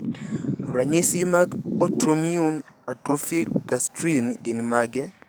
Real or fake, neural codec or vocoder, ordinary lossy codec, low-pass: fake; codec, 44.1 kHz, 2.6 kbps, SNAC; none; none